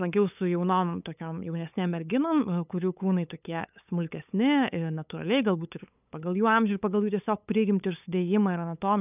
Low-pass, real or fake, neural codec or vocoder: 3.6 kHz; fake; codec, 16 kHz, 8 kbps, FunCodec, trained on LibriTTS, 25 frames a second